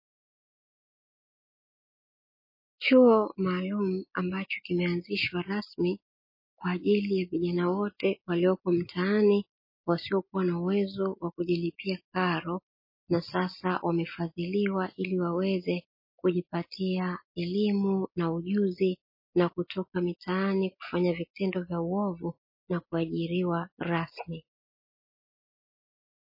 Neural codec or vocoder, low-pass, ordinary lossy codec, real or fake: none; 5.4 kHz; MP3, 24 kbps; real